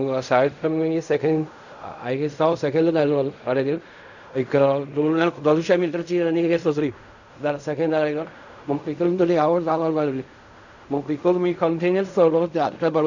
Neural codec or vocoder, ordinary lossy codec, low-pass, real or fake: codec, 16 kHz in and 24 kHz out, 0.4 kbps, LongCat-Audio-Codec, fine tuned four codebook decoder; none; 7.2 kHz; fake